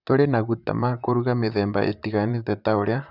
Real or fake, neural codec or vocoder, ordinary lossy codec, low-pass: fake; vocoder, 44.1 kHz, 128 mel bands, Pupu-Vocoder; none; 5.4 kHz